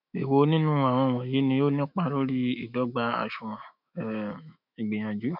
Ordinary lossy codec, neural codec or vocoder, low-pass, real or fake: none; autoencoder, 48 kHz, 128 numbers a frame, DAC-VAE, trained on Japanese speech; 5.4 kHz; fake